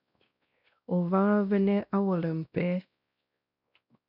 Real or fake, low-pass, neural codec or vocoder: fake; 5.4 kHz; codec, 16 kHz, 1 kbps, X-Codec, WavLM features, trained on Multilingual LibriSpeech